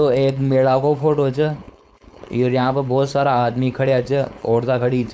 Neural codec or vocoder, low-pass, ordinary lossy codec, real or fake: codec, 16 kHz, 4.8 kbps, FACodec; none; none; fake